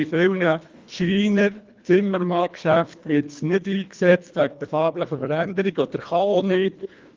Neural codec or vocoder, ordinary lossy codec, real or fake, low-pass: codec, 24 kHz, 1.5 kbps, HILCodec; Opus, 32 kbps; fake; 7.2 kHz